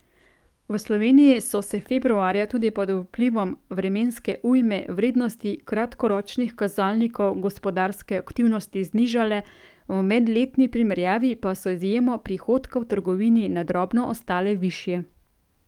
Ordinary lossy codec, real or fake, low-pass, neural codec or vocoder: Opus, 32 kbps; fake; 19.8 kHz; codec, 44.1 kHz, 7.8 kbps, DAC